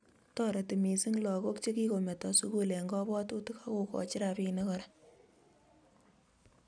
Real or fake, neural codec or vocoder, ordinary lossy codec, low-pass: real; none; none; 9.9 kHz